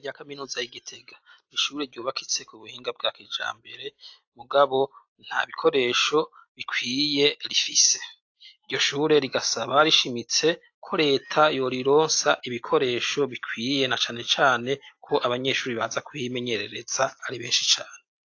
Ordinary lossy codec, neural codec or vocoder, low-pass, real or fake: AAC, 48 kbps; none; 7.2 kHz; real